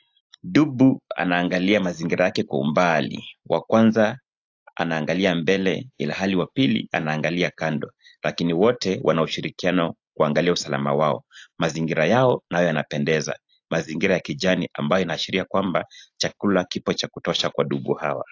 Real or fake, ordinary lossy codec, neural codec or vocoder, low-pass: real; AAC, 48 kbps; none; 7.2 kHz